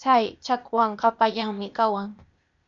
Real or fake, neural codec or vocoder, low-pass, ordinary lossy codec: fake; codec, 16 kHz, 0.8 kbps, ZipCodec; 7.2 kHz; MP3, 96 kbps